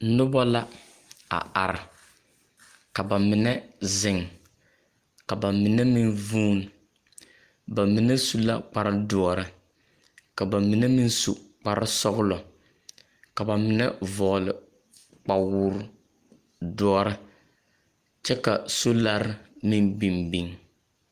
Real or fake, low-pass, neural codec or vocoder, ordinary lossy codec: real; 14.4 kHz; none; Opus, 32 kbps